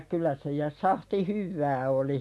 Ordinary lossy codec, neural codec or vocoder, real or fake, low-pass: none; none; real; none